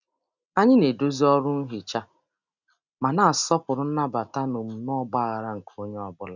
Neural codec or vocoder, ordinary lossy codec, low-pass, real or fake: none; none; 7.2 kHz; real